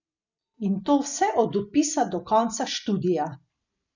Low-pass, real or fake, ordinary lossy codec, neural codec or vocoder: 7.2 kHz; real; none; none